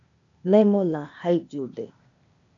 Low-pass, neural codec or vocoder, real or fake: 7.2 kHz; codec, 16 kHz, 0.8 kbps, ZipCodec; fake